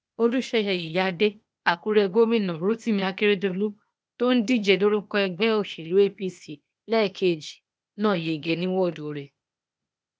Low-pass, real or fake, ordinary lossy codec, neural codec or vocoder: none; fake; none; codec, 16 kHz, 0.8 kbps, ZipCodec